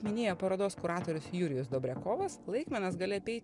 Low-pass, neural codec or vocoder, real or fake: 10.8 kHz; none; real